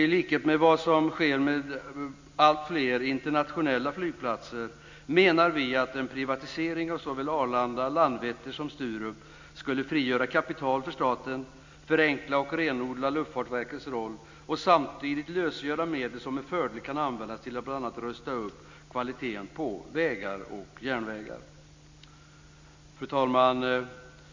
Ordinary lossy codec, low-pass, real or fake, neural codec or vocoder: MP3, 64 kbps; 7.2 kHz; real; none